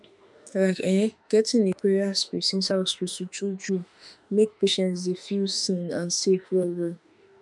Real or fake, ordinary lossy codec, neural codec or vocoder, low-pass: fake; none; autoencoder, 48 kHz, 32 numbers a frame, DAC-VAE, trained on Japanese speech; 10.8 kHz